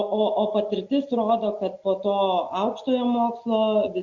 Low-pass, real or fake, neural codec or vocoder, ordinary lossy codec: 7.2 kHz; real; none; Opus, 64 kbps